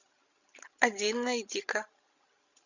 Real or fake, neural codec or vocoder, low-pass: fake; codec, 16 kHz, 16 kbps, FreqCodec, larger model; 7.2 kHz